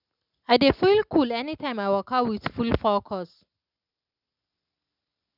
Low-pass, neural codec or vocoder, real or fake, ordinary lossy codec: 5.4 kHz; none; real; none